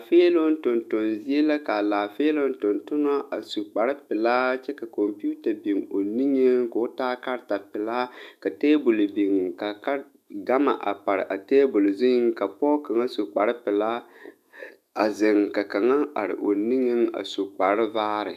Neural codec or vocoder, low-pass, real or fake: autoencoder, 48 kHz, 128 numbers a frame, DAC-VAE, trained on Japanese speech; 14.4 kHz; fake